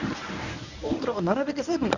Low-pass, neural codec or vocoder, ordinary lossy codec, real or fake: 7.2 kHz; codec, 24 kHz, 0.9 kbps, WavTokenizer, medium speech release version 1; none; fake